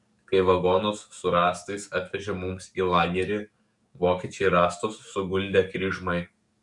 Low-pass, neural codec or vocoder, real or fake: 10.8 kHz; codec, 44.1 kHz, 7.8 kbps, DAC; fake